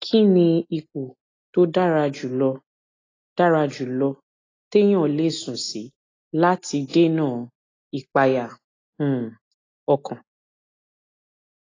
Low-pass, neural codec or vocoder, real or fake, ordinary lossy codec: 7.2 kHz; none; real; AAC, 32 kbps